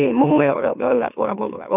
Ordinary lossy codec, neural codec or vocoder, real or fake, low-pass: none; autoencoder, 44.1 kHz, a latent of 192 numbers a frame, MeloTTS; fake; 3.6 kHz